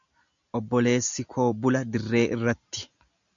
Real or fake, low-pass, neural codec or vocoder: real; 7.2 kHz; none